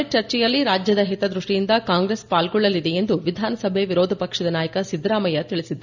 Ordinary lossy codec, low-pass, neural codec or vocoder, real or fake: none; 7.2 kHz; none; real